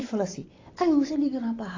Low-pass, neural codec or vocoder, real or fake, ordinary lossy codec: 7.2 kHz; none; real; AAC, 32 kbps